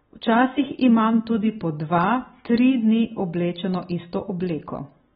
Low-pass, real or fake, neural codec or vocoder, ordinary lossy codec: 19.8 kHz; real; none; AAC, 16 kbps